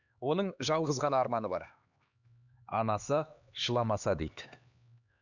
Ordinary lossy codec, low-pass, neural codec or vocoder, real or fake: none; 7.2 kHz; codec, 16 kHz, 2 kbps, X-Codec, HuBERT features, trained on LibriSpeech; fake